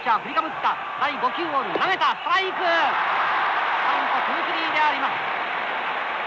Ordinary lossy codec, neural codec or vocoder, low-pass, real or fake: none; none; none; real